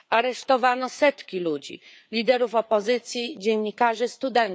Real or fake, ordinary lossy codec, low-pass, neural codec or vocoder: fake; none; none; codec, 16 kHz, 4 kbps, FreqCodec, larger model